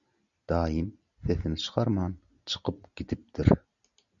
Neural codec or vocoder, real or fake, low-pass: none; real; 7.2 kHz